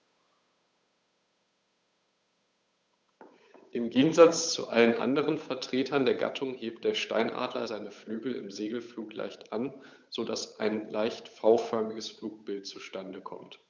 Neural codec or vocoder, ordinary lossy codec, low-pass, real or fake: codec, 16 kHz, 8 kbps, FunCodec, trained on Chinese and English, 25 frames a second; none; none; fake